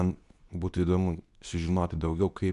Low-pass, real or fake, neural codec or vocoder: 10.8 kHz; fake; codec, 24 kHz, 0.9 kbps, WavTokenizer, medium speech release version 2